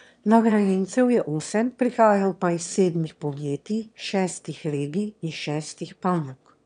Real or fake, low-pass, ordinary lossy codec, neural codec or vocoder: fake; 9.9 kHz; none; autoencoder, 22.05 kHz, a latent of 192 numbers a frame, VITS, trained on one speaker